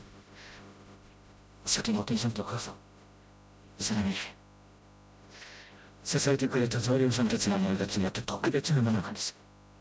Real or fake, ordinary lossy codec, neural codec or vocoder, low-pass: fake; none; codec, 16 kHz, 0.5 kbps, FreqCodec, smaller model; none